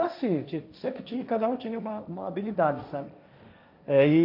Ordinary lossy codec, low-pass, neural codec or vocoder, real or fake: none; 5.4 kHz; codec, 16 kHz, 1.1 kbps, Voila-Tokenizer; fake